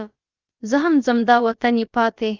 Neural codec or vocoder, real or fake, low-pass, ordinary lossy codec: codec, 16 kHz, about 1 kbps, DyCAST, with the encoder's durations; fake; 7.2 kHz; Opus, 24 kbps